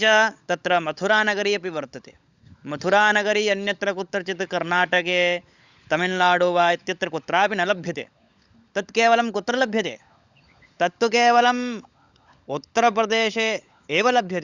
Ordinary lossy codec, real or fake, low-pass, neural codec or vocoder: Opus, 64 kbps; fake; 7.2 kHz; codec, 16 kHz, 16 kbps, FunCodec, trained on LibriTTS, 50 frames a second